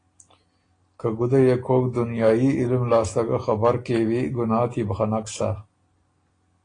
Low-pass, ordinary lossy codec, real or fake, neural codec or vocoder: 9.9 kHz; AAC, 32 kbps; real; none